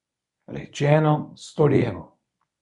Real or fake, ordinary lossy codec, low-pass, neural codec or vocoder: fake; MP3, 96 kbps; 10.8 kHz; codec, 24 kHz, 0.9 kbps, WavTokenizer, medium speech release version 1